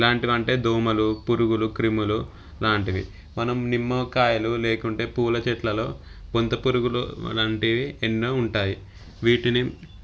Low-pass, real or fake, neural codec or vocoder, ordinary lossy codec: none; real; none; none